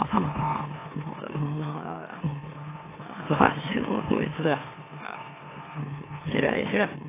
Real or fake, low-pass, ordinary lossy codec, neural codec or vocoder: fake; 3.6 kHz; AAC, 16 kbps; autoencoder, 44.1 kHz, a latent of 192 numbers a frame, MeloTTS